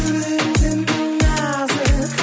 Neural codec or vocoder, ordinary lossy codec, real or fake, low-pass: none; none; real; none